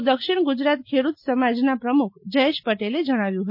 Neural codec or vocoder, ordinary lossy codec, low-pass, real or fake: none; MP3, 32 kbps; 5.4 kHz; real